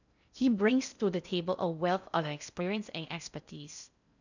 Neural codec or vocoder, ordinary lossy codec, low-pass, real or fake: codec, 16 kHz in and 24 kHz out, 0.6 kbps, FocalCodec, streaming, 2048 codes; none; 7.2 kHz; fake